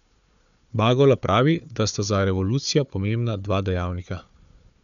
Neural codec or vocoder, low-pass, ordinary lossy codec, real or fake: codec, 16 kHz, 4 kbps, FunCodec, trained on Chinese and English, 50 frames a second; 7.2 kHz; none; fake